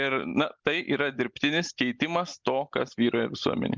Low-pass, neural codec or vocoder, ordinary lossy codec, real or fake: 7.2 kHz; none; Opus, 24 kbps; real